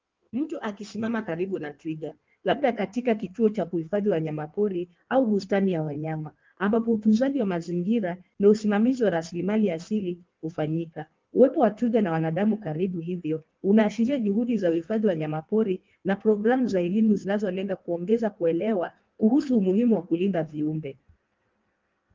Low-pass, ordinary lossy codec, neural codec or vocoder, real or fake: 7.2 kHz; Opus, 32 kbps; codec, 16 kHz in and 24 kHz out, 1.1 kbps, FireRedTTS-2 codec; fake